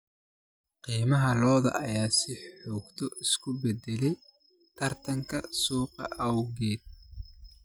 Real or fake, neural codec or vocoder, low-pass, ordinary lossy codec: real; none; none; none